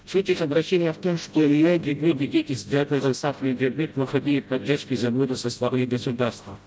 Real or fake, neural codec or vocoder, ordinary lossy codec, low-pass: fake; codec, 16 kHz, 0.5 kbps, FreqCodec, smaller model; none; none